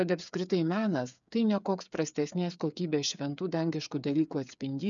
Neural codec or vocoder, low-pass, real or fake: codec, 16 kHz, 8 kbps, FreqCodec, smaller model; 7.2 kHz; fake